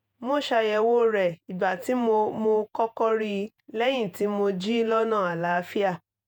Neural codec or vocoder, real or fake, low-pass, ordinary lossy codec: vocoder, 48 kHz, 128 mel bands, Vocos; fake; 19.8 kHz; none